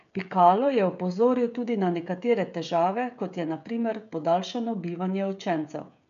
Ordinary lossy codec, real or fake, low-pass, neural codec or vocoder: none; fake; 7.2 kHz; codec, 16 kHz, 16 kbps, FreqCodec, smaller model